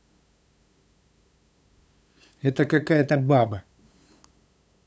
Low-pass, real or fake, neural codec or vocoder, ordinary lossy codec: none; fake; codec, 16 kHz, 8 kbps, FunCodec, trained on LibriTTS, 25 frames a second; none